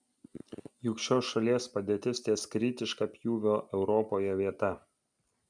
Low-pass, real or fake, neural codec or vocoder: 9.9 kHz; real; none